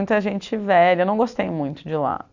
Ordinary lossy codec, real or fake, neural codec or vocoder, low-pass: none; real; none; 7.2 kHz